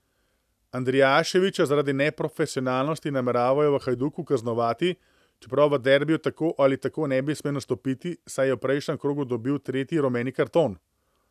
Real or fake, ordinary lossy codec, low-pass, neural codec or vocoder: real; none; 14.4 kHz; none